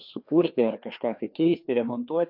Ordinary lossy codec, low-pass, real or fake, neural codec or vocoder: AAC, 48 kbps; 5.4 kHz; fake; codec, 16 kHz, 2 kbps, FreqCodec, larger model